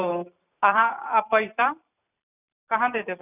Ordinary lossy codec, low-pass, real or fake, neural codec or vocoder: none; 3.6 kHz; fake; vocoder, 44.1 kHz, 128 mel bands every 512 samples, BigVGAN v2